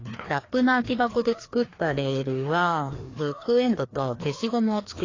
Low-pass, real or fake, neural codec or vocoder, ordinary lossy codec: 7.2 kHz; fake; codec, 16 kHz, 2 kbps, FreqCodec, larger model; AAC, 32 kbps